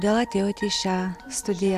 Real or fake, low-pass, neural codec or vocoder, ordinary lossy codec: real; 14.4 kHz; none; AAC, 96 kbps